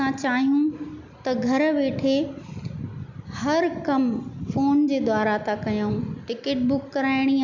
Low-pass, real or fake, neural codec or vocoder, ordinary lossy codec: 7.2 kHz; real; none; none